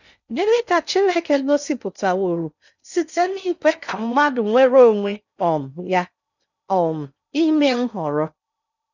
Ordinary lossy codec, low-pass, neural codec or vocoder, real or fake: none; 7.2 kHz; codec, 16 kHz in and 24 kHz out, 0.6 kbps, FocalCodec, streaming, 2048 codes; fake